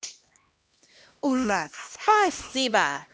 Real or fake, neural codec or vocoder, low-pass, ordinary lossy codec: fake; codec, 16 kHz, 1 kbps, X-Codec, HuBERT features, trained on LibriSpeech; none; none